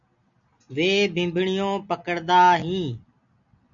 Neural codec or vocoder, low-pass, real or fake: none; 7.2 kHz; real